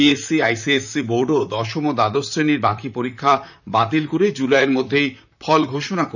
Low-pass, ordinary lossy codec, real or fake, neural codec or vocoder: 7.2 kHz; none; fake; vocoder, 44.1 kHz, 128 mel bands, Pupu-Vocoder